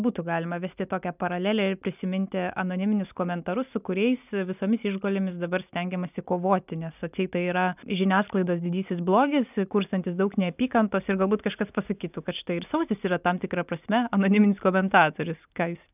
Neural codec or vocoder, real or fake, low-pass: none; real; 3.6 kHz